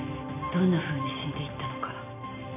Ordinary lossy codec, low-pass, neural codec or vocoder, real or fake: none; 3.6 kHz; none; real